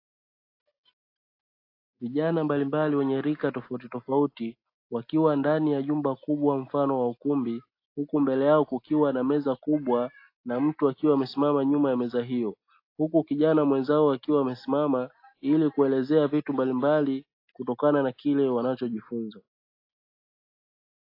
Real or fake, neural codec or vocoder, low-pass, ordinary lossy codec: real; none; 5.4 kHz; AAC, 32 kbps